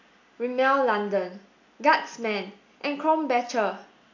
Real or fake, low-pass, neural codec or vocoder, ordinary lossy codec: real; 7.2 kHz; none; AAC, 48 kbps